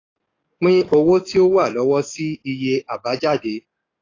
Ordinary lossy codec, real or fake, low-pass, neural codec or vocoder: AAC, 32 kbps; fake; 7.2 kHz; codec, 44.1 kHz, 7.8 kbps, DAC